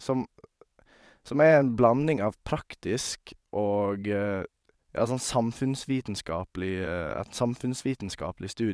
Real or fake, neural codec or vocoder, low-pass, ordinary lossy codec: fake; vocoder, 22.05 kHz, 80 mel bands, WaveNeXt; none; none